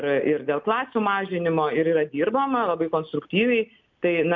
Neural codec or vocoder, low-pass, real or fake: none; 7.2 kHz; real